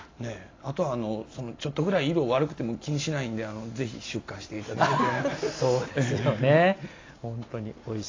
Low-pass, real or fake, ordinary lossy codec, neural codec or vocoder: 7.2 kHz; real; AAC, 32 kbps; none